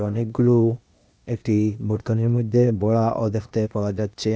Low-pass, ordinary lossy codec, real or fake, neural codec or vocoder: none; none; fake; codec, 16 kHz, 0.8 kbps, ZipCodec